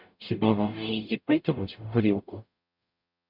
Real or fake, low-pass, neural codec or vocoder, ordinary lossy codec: fake; 5.4 kHz; codec, 44.1 kHz, 0.9 kbps, DAC; MP3, 48 kbps